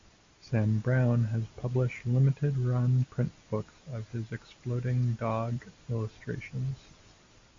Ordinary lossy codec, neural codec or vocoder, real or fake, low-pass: AAC, 48 kbps; none; real; 7.2 kHz